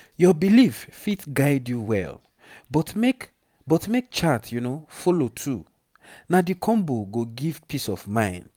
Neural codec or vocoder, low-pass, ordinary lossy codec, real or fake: vocoder, 44.1 kHz, 128 mel bands every 512 samples, BigVGAN v2; 19.8 kHz; none; fake